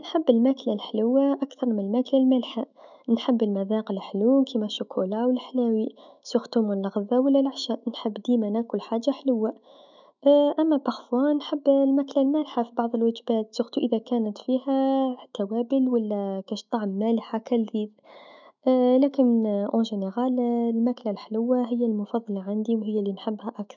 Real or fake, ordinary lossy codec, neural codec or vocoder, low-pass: fake; none; autoencoder, 48 kHz, 128 numbers a frame, DAC-VAE, trained on Japanese speech; 7.2 kHz